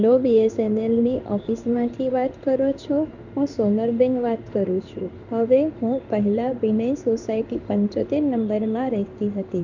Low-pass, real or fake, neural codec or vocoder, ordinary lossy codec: 7.2 kHz; fake; codec, 16 kHz in and 24 kHz out, 1 kbps, XY-Tokenizer; none